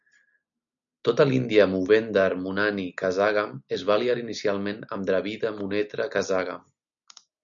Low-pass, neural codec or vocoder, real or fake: 7.2 kHz; none; real